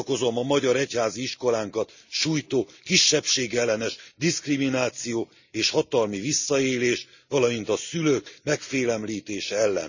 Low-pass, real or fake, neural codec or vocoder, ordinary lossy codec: 7.2 kHz; real; none; none